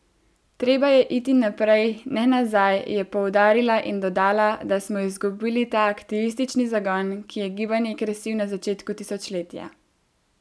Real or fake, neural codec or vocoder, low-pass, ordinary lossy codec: real; none; none; none